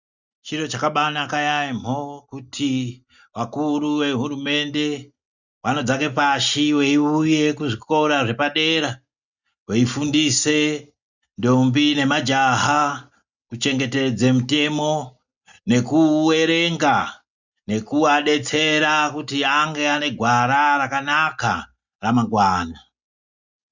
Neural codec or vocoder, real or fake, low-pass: none; real; 7.2 kHz